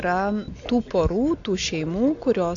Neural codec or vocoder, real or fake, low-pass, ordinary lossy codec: none; real; 7.2 kHz; AAC, 48 kbps